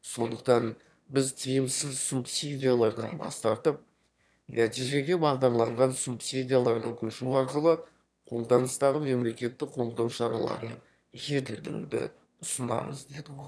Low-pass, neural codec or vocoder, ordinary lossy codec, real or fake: none; autoencoder, 22.05 kHz, a latent of 192 numbers a frame, VITS, trained on one speaker; none; fake